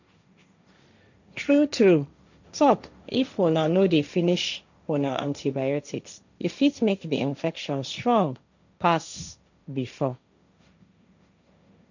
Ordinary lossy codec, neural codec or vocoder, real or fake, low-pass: none; codec, 16 kHz, 1.1 kbps, Voila-Tokenizer; fake; 7.2 kHz